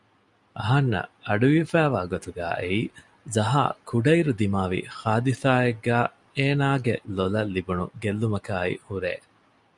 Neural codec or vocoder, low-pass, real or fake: none; 10.8 kHz; real